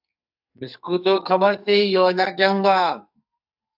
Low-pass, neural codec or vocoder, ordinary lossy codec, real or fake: 5.4 kHz; codec, 44.1 kHz, 2.6 kbps, SNAC; AAC, 48 kbps; fake